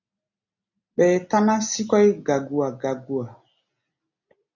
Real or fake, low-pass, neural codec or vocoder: real; 7.2 kHz; none